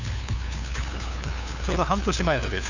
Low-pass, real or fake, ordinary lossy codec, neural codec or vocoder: 7.2 kHz; fake; none; codec, 16 kHz, 2 kbps, FunCodec, trained on LibriTTS, 25 frames a second